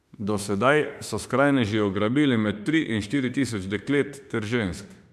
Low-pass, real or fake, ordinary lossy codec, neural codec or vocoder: 14.4 kHz; fake; none; autoencoder, 48 kHz, 32 numbers a frame, DAC-VAE, trained on Japanese speech